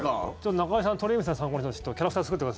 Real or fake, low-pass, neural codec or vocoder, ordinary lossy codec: real; none; none; none